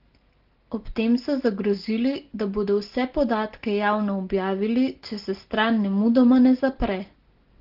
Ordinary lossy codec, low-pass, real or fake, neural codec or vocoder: Opus, 16 kbps; 5.4 kHz; real; none